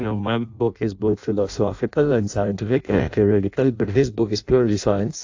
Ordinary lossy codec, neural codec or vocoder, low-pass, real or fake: AAC, 48 kbps; codec, 16 kHz in and 24 kHz out, 0.6 kbps, FireRedTTS-2 codec; 7.2 kHz; fake